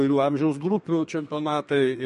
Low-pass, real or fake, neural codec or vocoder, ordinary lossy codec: 14.4 kHz; fake; codec, 32 kHz, 1.9 kbps, SNAC; MP3, 48 kbps